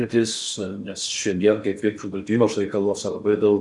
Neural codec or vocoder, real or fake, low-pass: codec, 16 kHz in and 24 kHz out, 0.6 kbps, FocalCodec, streaming, 4096 codes; fake; 10.8 kHz